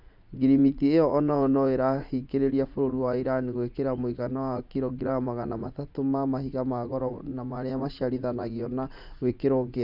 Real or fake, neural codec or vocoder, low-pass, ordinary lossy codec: fake; vocoder, 44.1 kHz, 80 mel bands, Vocos; 5.4 kHz; none